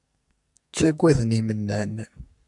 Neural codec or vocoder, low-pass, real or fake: codec, 44.1 kHz, 2.6 kbps, SNAC; 10.8 kHz; fake